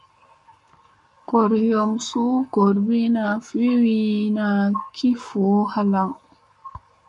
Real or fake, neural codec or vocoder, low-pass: fake; codec, 44.1 kHz, 7.8 kbps, Pupu-Codec; 10.8 kHz